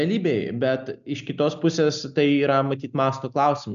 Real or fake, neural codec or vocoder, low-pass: real; none; 7.2 kHz